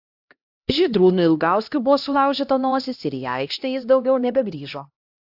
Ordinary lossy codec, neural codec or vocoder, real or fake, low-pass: AAC, 48 kbps; codec, 16 kHz, 1 kbps, X-Codec, HuBERT features, trained on LibriSpeech; fake; 5.4 kHz